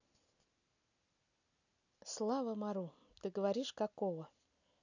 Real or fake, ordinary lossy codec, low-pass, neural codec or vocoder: real; none; 7.2 kHz; none